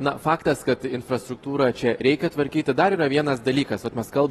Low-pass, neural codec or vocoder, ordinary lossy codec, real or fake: 19.8 kHz; none; AAC, 32 kbps; real